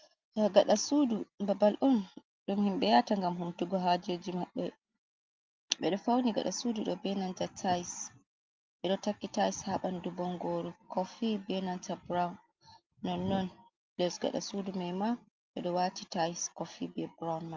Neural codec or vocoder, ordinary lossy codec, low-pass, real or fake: none; Opus, 24 kbps; 7.2 kHz; real